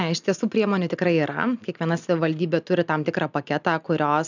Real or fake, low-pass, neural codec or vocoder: real; 7.2 kHz; none